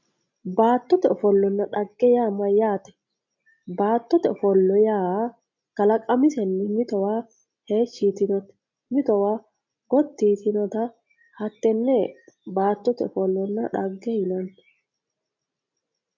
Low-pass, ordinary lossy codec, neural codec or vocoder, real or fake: 7.2 kHz; MP3, 48 kbps; none; real